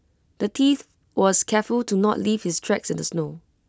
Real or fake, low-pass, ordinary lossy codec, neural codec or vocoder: real; none; none; none